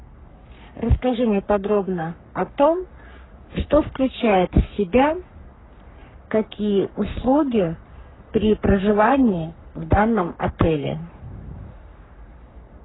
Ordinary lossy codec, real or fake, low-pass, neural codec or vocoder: AAC, 16 kbps; fake; 7.2 kHz; codec, 44.1 kHz, 3.4 kbps, Pupu-Codec